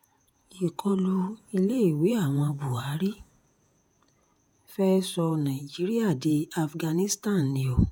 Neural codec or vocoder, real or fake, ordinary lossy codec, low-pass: vocoder, 48 kHz, 128 mel bands, Vocos; fake; none; none